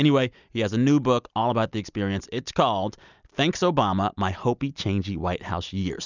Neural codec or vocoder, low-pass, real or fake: none; 7.2 kHz; real